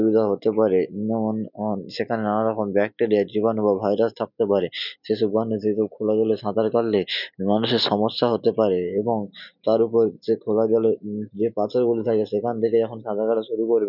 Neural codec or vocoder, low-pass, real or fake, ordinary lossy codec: none; 5.4 kHz; real; none